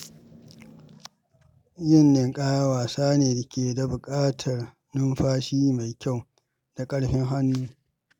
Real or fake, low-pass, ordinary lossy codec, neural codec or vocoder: real; 19.8 kHz; none; none